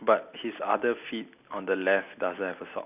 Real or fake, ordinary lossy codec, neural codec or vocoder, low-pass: real; none; none; 3.6 kHz